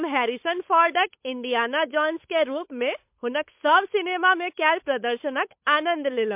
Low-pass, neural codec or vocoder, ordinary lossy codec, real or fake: 3.6 kHz; codec, 16 kHz, 8 kbps, FunCodec, trained on Chinese and English, 25 frames a second; MP3, 32 kbps; fake